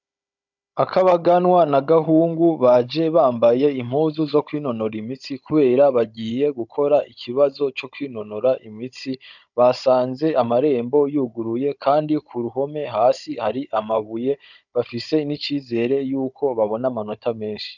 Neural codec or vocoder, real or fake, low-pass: codec, 16 kHz, 16 kbps, FunCodec, trained on Chinese and English, 50 frames a second; fake; 7.2 kHz